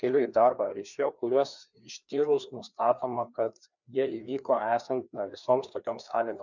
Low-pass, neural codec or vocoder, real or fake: 7.2 kHz; codec, 16 kHz, 2 kbps, FreqCodec, larger model; fake